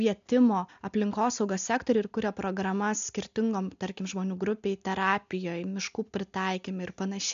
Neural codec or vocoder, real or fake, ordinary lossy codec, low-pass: none; real; MP3, 64 kbps; 7.2 kHz